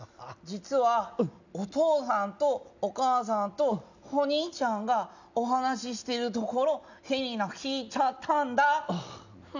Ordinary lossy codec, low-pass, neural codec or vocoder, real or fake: none; 7.2 kHz; none; real